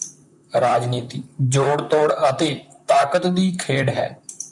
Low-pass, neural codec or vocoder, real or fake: 10.8 kHz; vocoder, 44.1 kHz, 128 mel bands, Pupu-Vocoder; fake